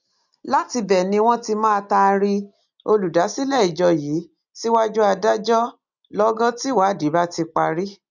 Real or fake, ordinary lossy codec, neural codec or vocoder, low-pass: real; none; none; 7.2 kHz